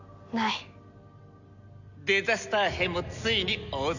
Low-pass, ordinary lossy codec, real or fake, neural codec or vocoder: 7.2 kHz; none; real; none